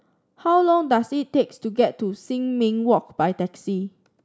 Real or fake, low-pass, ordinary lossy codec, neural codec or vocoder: real; none; none; none